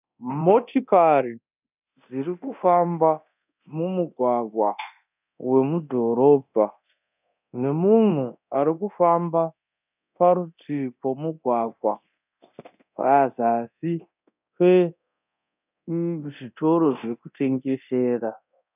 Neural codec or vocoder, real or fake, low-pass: codec, 24 kHz, 0.9 kbps, DualCodec; fake; 3.6 kHz